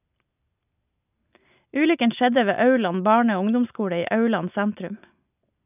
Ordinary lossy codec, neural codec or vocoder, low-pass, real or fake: none; none; 3.6 kHz; real